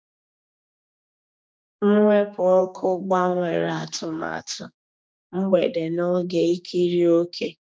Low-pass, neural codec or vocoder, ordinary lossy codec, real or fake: none; codec, 16 kHz, 2 kbps, X-Codec, HuBERT features, trained on general audio; none; fake